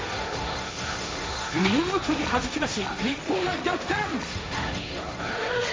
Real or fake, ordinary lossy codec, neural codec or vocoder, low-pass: fake; none; codec, 16 kHz, 1.1 kbps, Voila-Tokenizer; none